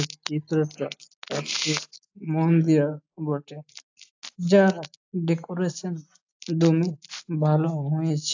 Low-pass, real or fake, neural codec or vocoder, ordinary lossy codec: 7.2 kHz; real; none; AAC, 48 kbps